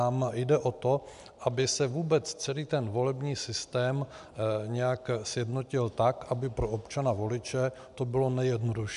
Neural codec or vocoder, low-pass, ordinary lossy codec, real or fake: none; 10.8 kHz; AAC, 96 kbps; real